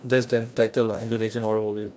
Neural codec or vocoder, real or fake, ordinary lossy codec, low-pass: codec, 16 kHz, 1 kbps, FreqCodec, larger model; fake; none; none